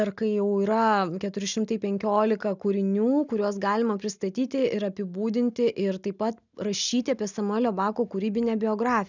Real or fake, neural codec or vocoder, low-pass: real; none; 7.2 kHz